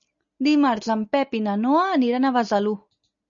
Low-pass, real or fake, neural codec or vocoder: 7.2 kHz; real; none